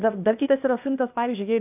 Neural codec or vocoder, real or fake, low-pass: codec, 16 kHz, 0.8 kbps, ZipCodec; fake; 3.6 kHz